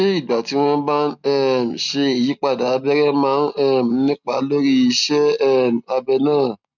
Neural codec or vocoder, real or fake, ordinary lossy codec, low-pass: none; real; none; 7.2 kHz